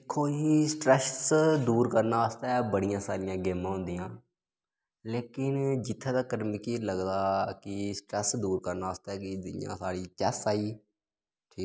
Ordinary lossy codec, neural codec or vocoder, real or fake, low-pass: none; none; real; none